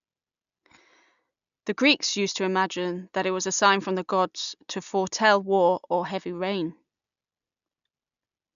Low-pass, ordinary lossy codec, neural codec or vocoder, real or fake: 7.2 kHz; none; none; real